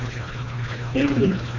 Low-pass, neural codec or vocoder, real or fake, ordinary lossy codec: 7.2 kHz; codec, 24 kHz, 1.5 kbps, HILCodec; fake; MP3, 64 kbps